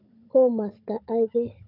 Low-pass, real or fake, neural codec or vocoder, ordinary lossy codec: 5.4 kHz; fake; codec, 16 kHz, 16 kbps, FunCodec, trained on Chinese and English, 50 frames a second; none